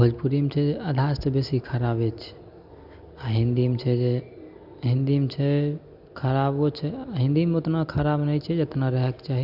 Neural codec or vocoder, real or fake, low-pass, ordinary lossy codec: none; real; 5.4 kHz; none